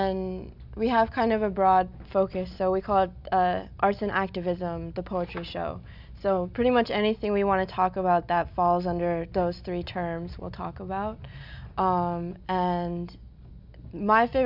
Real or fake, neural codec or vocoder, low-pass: real; none; 5.4 kHz